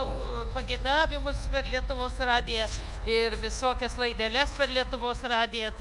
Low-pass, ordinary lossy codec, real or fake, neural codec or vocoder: 10.8 kHz; MP3, 96 kbps; fake; codec, 24 kHz, 1.2 kbps, DualCodec